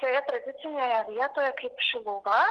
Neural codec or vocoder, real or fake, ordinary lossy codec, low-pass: none; real; Opus, 16 kbps; 10.8 kHz